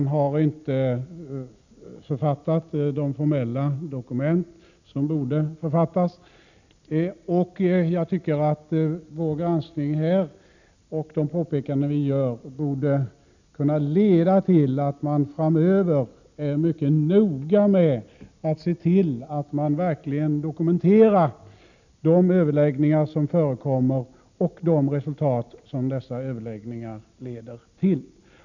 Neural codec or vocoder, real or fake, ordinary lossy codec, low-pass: none; real; none; 7.2 kHz